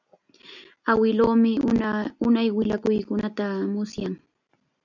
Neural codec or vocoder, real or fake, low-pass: none; real; 7.2 kHz